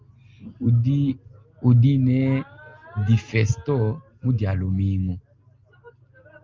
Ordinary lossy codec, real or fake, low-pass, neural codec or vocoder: Opus, 32 kbps; real; 7.2 kHz; none